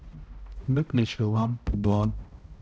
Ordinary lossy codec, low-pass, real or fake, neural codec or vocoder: none; none; fake; codec, 16 kHz, 0.5 kbps, X-Codec, HuBERT features, trained on balanced general audio